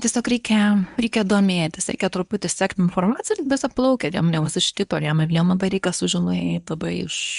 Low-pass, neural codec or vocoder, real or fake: 10.8 kHz; codec, 24 kHz, 0.9 kbps, WavTokenizer, medium speech release version 1; fake